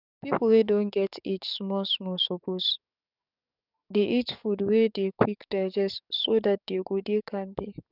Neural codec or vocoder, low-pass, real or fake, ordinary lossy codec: codec, 44.1 kHz, 7.8 kbps, DAC; 5.4 kHz; fake; none